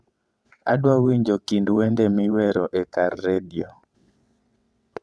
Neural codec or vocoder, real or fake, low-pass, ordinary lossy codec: vocoder, 22.05 kHz, 80 mel bands, WaveNeXt; fake; none; none